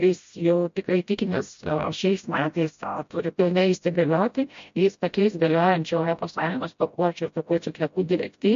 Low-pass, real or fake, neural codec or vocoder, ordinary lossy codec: 7.2 kHz; fake; codec, 16 kHz, 0.5 kbps, FreqCodec, smaller model; MP3, 48 kbps